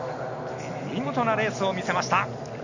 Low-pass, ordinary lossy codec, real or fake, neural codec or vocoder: 7.2 kHz; none; real; none